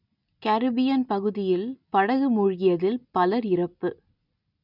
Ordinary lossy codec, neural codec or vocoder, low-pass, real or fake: none; none; 5.4 kHz; real